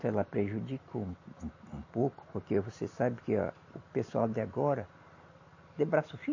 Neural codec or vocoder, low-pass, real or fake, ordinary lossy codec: none; 7.2 kHz; real; MP3, 32 kbps